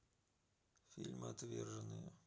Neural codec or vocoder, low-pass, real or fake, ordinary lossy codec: none; none; real; none